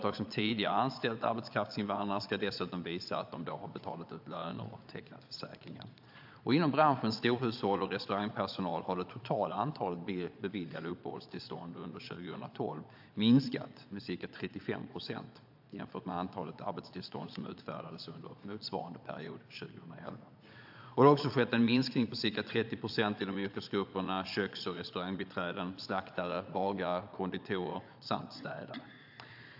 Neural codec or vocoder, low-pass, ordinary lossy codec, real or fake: vocoder, 22.05 kHz, 80 mel bands, Vocos; 5.4 kHz; AAC, 48 kbps; fake